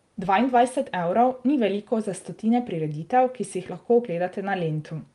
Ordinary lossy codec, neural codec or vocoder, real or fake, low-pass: Opus, 32 kbps; none; real; 10.8 kHz